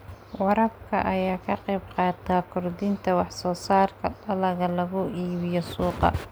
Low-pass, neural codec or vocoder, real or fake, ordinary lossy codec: none; none; real; none